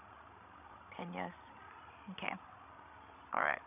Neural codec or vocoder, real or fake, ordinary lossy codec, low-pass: codec, 16 kHz, 16 kbps, FreqCodec, larger model; fake; none; 3.6 kHz